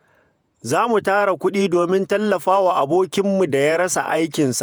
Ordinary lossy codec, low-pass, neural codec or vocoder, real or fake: none; none; vocoder, 48 kHz, 128 mel bands, Vocos; fake